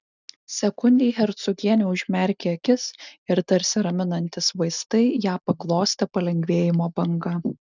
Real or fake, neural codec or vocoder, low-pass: real; none; 7.2 kHz